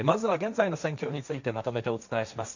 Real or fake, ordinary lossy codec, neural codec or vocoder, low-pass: fake; none; codec, 16 kHz, 1.1 kbps, Voila-Tokenizer; 7.2 kHz